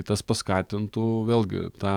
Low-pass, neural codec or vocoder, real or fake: 19.8 kHz; codec, 44.1 kHz, 7.8 kbps, DAC; fake